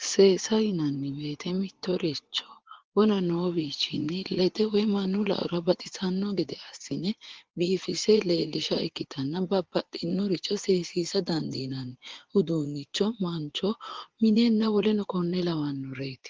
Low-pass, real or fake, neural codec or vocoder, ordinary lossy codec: 7.2 kHz; fake; vocoder, 24 kHz, 100 mel bands, Vocos; Opus, 16 kbps